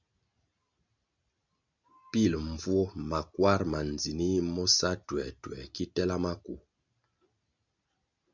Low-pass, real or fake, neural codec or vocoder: 7.2 kHz; real; none